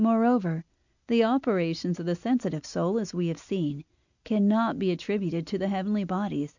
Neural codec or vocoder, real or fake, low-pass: none; real; 7.2 kHz